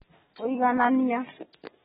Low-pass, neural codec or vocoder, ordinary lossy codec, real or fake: 19.8 kHz; none; AAC, 16 kbps; real